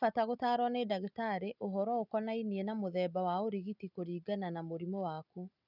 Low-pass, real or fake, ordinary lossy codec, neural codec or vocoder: 5.4 kHz; real; none; none